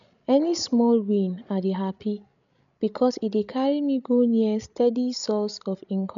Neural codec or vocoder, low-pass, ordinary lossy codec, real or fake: codec, 16 kHz, 16 kbps, FreqCodec, larger model; 7.2 kHz; none; fake